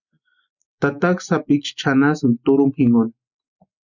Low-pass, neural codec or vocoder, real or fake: 7.2 kHz; none; real